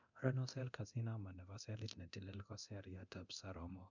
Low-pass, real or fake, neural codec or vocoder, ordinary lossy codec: 7.2 kHz; fake; codec, 24 kHz, 0.9 kbps, DualCodec; none